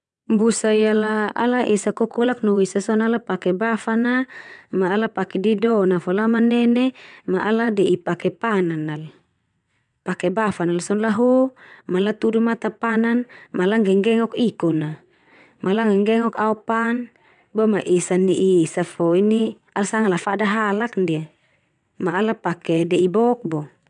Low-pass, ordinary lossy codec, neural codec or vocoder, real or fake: 9.9 kHz; none; vocoder, 22.05 kHz, 80 mel bands, WaveNeXt; fake